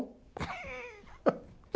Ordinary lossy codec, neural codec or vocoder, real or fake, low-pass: none; none; real; none